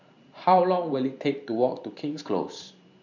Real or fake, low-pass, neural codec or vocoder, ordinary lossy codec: fake; 7.2 kHz; vocoder, 44.1 kHz, 128 mel bands every 512 samples, BigVGAN v2; none